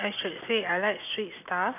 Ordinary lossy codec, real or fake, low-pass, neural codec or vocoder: none; real; 3.6 kHz; none